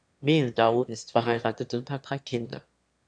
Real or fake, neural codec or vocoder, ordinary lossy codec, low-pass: fake; autoencoder, 22.05 kHz, a latent of 192 numbers a frame, VITS, trained on one speaker; AAC, 64 kbps; 9.9 kHz